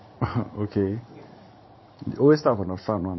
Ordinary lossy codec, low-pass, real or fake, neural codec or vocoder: MP3, 24 kbps; 7.2 kHz; fake; vocoder, 44.1 kHz, 80 mel bands, Vocos